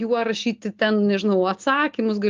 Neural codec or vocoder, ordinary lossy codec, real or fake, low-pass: none; Opus, 32 kbps; real; 7.2 kHz